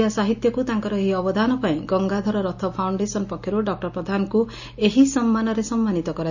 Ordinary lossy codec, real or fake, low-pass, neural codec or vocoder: none; real; 7.2 kHz; none